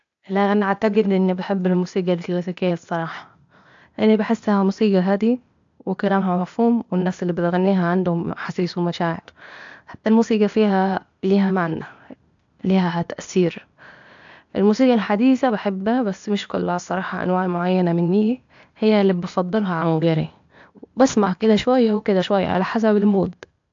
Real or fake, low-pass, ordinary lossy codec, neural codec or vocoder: fake; 7.2 kHz; AAC, 64 kbps; codec, 16 kHz, 0.8 kbps, ZipCodec